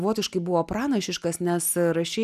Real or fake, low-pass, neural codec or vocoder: real; 14.4 kHz; none